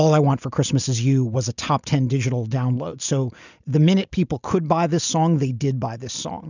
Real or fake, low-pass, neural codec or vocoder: real; 7.2 kHz; none